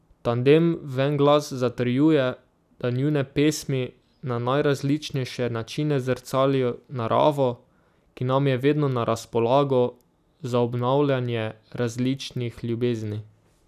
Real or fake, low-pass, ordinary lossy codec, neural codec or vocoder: real; 14.4 kHz; none; none